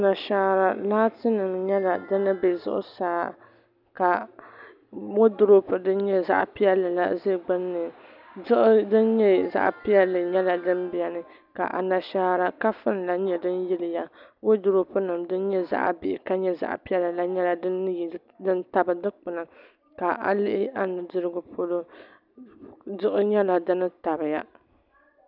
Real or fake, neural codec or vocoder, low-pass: real; none; 5.4 kHz